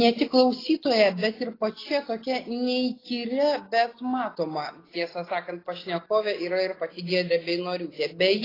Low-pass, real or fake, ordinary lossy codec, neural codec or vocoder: 5.4 kHz; real; AAC, 24 kbps; none